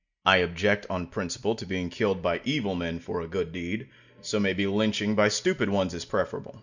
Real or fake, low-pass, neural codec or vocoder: real; 7.2 kHz; none